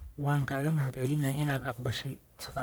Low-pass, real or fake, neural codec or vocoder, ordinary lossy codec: none; fake; codec, 44.1 kHz, 1.7 kbps, Pupu-Codec; none